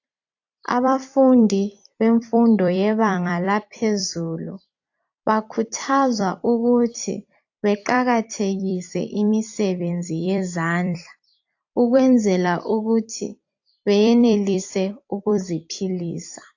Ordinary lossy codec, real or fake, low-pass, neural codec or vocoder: AAC, 48 kbps; fake; 7.2 kHz; vocoder, 44.1 kHz, 128 mel bands every 256 samples, BigVGAN v2